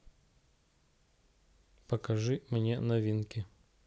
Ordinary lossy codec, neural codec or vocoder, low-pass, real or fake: none; none; none; real